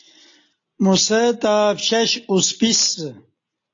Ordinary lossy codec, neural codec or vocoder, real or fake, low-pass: AAC, 48 kbps; none; real; 7.2 kHz